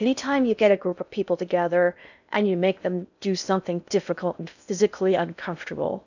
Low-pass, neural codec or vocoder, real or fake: 7.2 kHz; codec, 16 kHz in and 24 kHz out, 0.6 kbps, FocalCodec, streaming, 4096 codes; fake